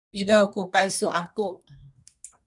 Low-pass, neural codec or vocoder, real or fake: 10.8 kHz; codec, 24 kHz, 1 kbps, SNAC; fake